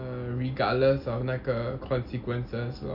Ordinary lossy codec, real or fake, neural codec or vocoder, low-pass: none; real; none; 5.4 kHz